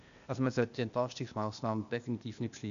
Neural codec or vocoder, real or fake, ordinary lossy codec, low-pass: codec, 16 kHz, 0.8 kbps, ZipCodec; fake; none; 7.2 kHz